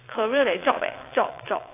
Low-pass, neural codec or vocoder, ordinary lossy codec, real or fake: 3.6 kHz; vocoder, 22.05 kHz, 80 mel bands, WaveNeXt; AAC, 24 kbps; fake